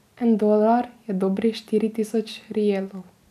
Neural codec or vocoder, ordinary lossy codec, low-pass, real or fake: none; none; 14.4 kHz; real